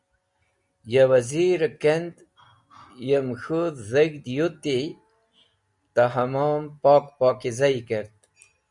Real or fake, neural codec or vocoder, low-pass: real; none; 10.8 kHz